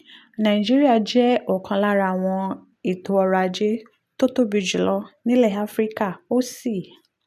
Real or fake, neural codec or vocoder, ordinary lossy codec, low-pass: real; none; none; 14.4 kHz